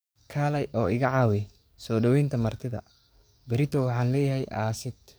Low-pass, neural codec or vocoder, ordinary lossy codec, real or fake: none; codec, 44.1 kHz, 7.8 kbps, DAC; none; fake